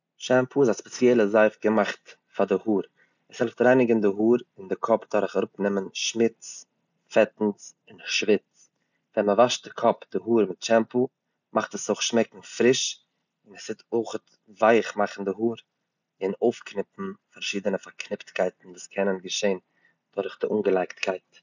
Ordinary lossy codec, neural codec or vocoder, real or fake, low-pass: none; none; real; 7.2 kHz